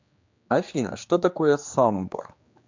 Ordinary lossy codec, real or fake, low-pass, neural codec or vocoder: MP3, 64 kbps; fake; 7.2 kHz; codec, 16 kHz, 2 kbps, X-Codec, HuBERT features, trained on general audio